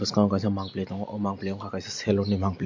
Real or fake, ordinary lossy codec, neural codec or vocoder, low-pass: real; MP3, 48 kbps; none; 7.2 kHz